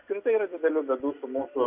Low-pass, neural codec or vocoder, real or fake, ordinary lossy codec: 3.6 kHz; none; real; Opus, 64 kbps